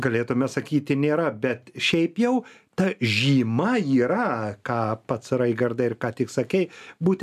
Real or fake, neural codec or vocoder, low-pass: real; none; 14.4 kHz